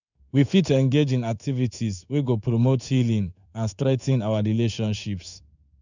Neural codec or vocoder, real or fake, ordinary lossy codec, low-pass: codec, 16 kHz in and 24 kHz out, 1 kbps, XY-Tokenizer; fake; none; 7.2 kHz